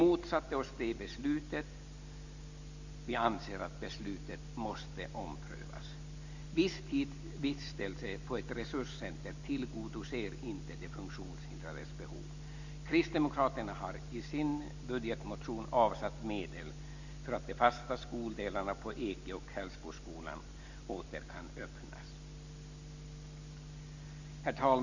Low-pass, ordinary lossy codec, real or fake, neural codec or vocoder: 7.2 kHz; Opus, 64 kbps; real; none